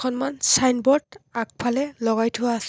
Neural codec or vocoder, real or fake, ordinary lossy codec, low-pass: none; real; none; none